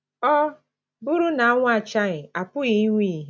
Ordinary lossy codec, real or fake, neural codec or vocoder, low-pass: none; real; none; none